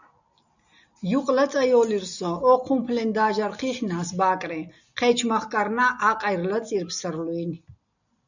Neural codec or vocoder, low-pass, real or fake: none; 7.2 kHz; real